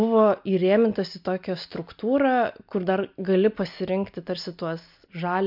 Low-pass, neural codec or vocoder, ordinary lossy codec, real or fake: 5.4 kHz; none; MP3, 48 kbps; real